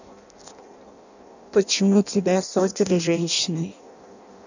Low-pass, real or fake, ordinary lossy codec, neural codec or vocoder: 7.2 kHz; fake; none; codec, 16 kHz in and 24 kHz out, 0.6 kbps, FireRedTTS-2 codec